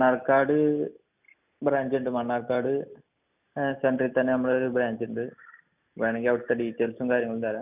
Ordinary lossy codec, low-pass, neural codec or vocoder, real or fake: none; 3.6 kHz; none; real